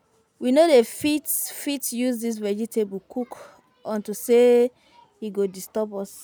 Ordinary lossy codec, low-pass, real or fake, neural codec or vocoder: none; none; real; none